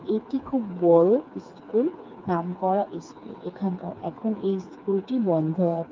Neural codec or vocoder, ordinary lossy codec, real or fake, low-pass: codec, 16 kHz, 4 kbps, FreqCodec, smaller model; Opus, 24 kbps; fake; 7.2 kHz